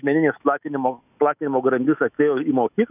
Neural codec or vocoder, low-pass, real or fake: autoencoder, 48 kHz, 128 numbers a frame, DAC-VAE, trained on Japanese speech; 3.6 kHz; fake